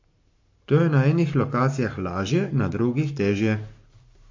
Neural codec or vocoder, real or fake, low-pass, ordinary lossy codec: codec, 44.1 kHz, 7.8 kbps, Pupu-Codec; fake; 7.2 kHz; MP3, 48 kbps